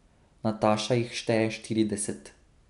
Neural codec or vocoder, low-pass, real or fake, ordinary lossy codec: none; 10.8 kHz; real; none